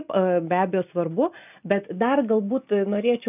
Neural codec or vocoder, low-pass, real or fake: none; 3.6 kHz; real